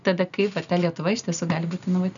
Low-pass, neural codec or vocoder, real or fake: 7.2 kHz; none; real